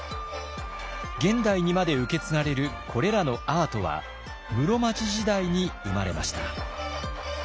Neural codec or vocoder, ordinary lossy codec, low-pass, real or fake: none; none; none; real